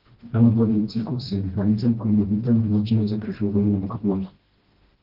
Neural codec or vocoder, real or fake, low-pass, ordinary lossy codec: codec, 16 kHz, 1 kbps, FreqCodec, smaller model; fake; 5.4 kHz; Opus, 16 kbps